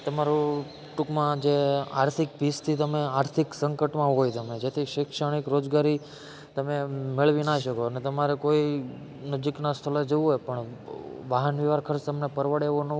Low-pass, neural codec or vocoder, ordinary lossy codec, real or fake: none; none; none; real